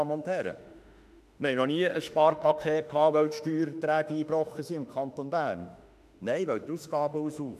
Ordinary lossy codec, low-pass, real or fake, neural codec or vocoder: none; 14.4 kHz; fake; autoencoder, 48 kHz, 32 numbers a frame, DAC-VAE, trained on Japanese speech